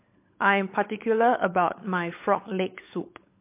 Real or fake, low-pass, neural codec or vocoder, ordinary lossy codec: fake; 3.6 kHz; codec, 16 kHz, 16 kbps, FunCodec, trained on LibriTTS, 50 frames a second; MP3, 24 kbps